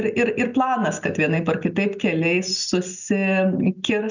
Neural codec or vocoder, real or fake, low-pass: none; real; 7.2 kHz